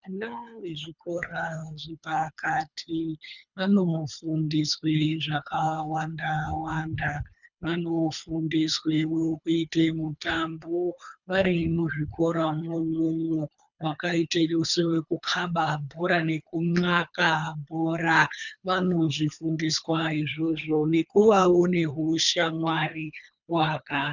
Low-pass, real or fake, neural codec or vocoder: 7.2 kHz; fake; codec, 24 kHz, 3 kbps, HILCodec